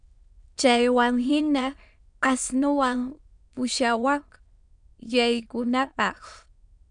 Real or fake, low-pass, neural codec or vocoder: fake; 9.9 kHz; autoencoder, 22.05 kHz, a latent of 192 numbers a frame, VITS, trained on many speakers